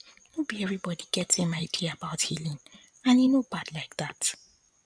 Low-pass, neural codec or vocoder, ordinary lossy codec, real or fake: 9.9 kHz; vocoder, 24 kHz, 100 mel bands, Vocos; none; fake